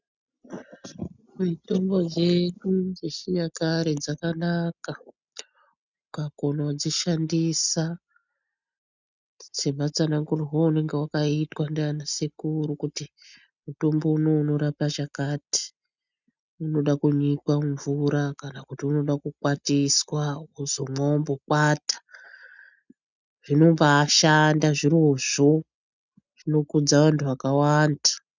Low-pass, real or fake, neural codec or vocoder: 7.2 kHz; real; none